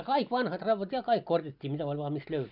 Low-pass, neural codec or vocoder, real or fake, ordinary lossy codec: 5.4 kHz; none; real; none